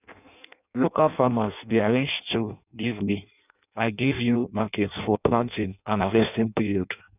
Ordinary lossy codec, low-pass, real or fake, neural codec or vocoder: none; 3.6 kHz; fake; codec, 16 kHz in and 24 kHz out, 0.6 kbps, FireRedTTS-2 codec